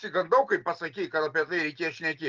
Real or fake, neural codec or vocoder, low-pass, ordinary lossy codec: real; none; 7.2 kHz; Opus, 32 kbps